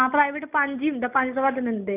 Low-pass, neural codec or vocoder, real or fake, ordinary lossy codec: 3.6 kHz; none; real; none